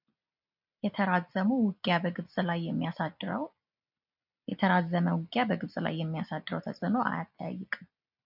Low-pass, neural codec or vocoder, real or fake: 5.4 kHz; none; real